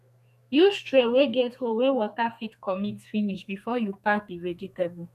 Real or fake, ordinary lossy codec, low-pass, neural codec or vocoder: fake; none; 14.4 kHz; codec, 32 kHz, 1.9 kbps, SNAC